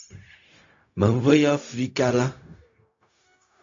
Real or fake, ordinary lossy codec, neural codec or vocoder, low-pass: fake; AAC, 48 kbps; codec, 16 kHz, 0.4 kbps, LongCat-Audio-Codec; 7.2 kHz